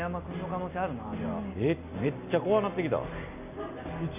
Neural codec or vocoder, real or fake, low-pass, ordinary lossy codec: none; real; 3.6 kHz; none